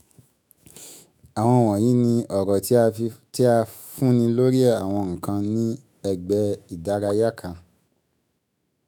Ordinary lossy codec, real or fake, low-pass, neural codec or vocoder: none; fake; none; autoencoder, 48 kHz, 128 numbers a frame, DAC-VAE, trained on Japanese speech